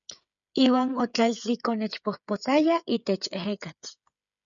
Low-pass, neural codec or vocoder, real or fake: 7.2 kHz; codec, 16 kHz, 8 kbps, FreqCodec, smaller model; fake